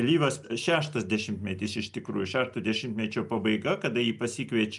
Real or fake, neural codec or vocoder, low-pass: real; none; 10.8 kHz